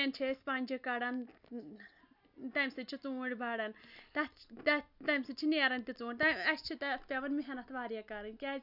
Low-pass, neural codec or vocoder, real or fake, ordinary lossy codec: 5.4 kHz; none; real; none